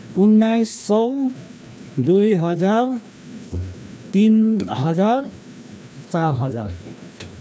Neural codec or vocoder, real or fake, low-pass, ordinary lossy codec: codec, 16 kHz, 1 kbps, FreqCodec, larger model; fake; none; none